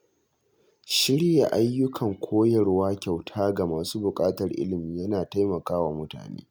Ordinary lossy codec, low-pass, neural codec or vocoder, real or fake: none; none; none; real